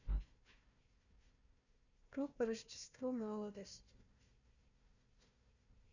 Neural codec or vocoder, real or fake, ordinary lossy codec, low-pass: codec, 16 kHz, 1 kbps, FunCodec, trained on Chinese and English, 50 frames a second; fake; none; 7.2 kHz